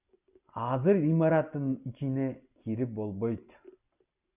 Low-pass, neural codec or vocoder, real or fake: 3.6 kHz; none; real